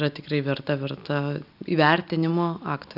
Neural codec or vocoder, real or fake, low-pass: none; real; 5.4 kHz